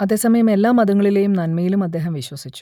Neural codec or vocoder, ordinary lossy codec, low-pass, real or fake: none; none; 19.8 kHz; real